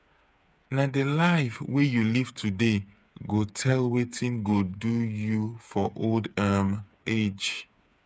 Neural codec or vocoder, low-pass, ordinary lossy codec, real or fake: codec, 16 kHz, 8 kbps, FreqCodec, smaller model; none; none; fake